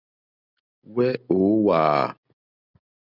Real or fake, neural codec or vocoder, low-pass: real; none; 5.4 kHz